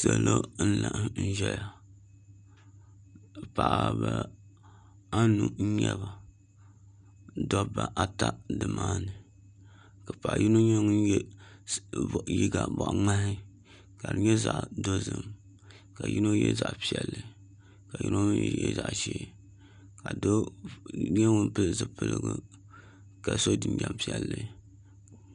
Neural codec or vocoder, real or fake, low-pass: none; real; 9.9 kHz